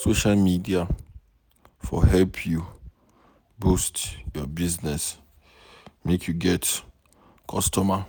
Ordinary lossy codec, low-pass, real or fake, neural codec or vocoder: none; none; real; none